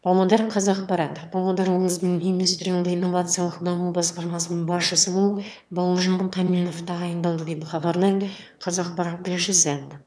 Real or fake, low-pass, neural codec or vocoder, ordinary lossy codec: fake; none; autoencoder, 22.05 kHz, a latent of 192 numbers a frame, VITS, trained on one speaker; none